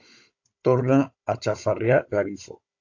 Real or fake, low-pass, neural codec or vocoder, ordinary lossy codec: fake; 7.2 kHz; codec, 16 kHz, 16 kbps, FunCodec, trained on Chinese and English, 50 frames a second; AAC, 48 kbps